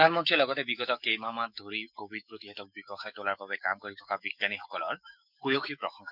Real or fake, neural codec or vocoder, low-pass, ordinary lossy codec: fake; autoencoder, 48 kHz, 128 numbers a frame, DAC-VAE, trained on Japanese speech; 5.4 kHz; none